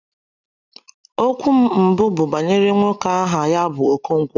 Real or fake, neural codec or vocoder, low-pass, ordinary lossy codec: real; none; 7.2 kHz; none